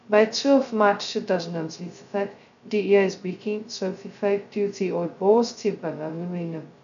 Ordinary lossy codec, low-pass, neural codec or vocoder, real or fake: none; 7.2 kHz; codec, 16 kHz, 0.2 kbps, FocalCodec; fake